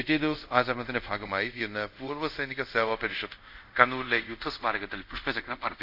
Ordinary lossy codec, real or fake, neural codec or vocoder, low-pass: none; fake; codec, 24 kHz, 0.5 kbps, DualCodec; 5.4 kHz